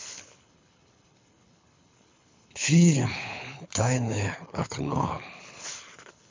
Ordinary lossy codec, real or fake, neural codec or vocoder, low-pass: MP3, 64 kbps; fake; codec, 24 kHz, 6 kbps, HILCodec; 7.2 kHz